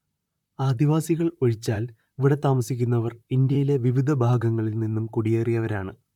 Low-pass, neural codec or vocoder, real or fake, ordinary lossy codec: 19.8 kHz; vocoder, 44.1 kHz, 128 mel bands, Pupu-Vocoder; fake; MP3, 96 kbps